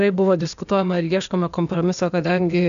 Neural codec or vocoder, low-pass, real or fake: codec, 16 kHz, 0.8 kbps, ZipCodec; 7.2 kHz; fake